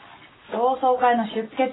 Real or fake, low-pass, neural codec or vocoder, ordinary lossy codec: real; 7.2 kHz; none; AAC, 16 kbps